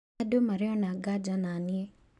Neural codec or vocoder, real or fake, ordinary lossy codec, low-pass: none; real; none; 10.8 kHz